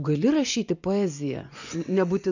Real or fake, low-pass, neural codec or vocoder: fake; 7.2 kHz; vocoder, 24 kHz, 100 mel bands, Vocos